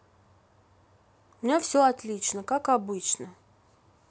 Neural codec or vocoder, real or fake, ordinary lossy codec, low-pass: none; real; none; none